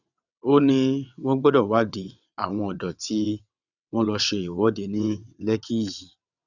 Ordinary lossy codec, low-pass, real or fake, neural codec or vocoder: none; 7.2 kHz; fake; vocoder, 22.05 kHz, 80 mel bands, WaveNeXt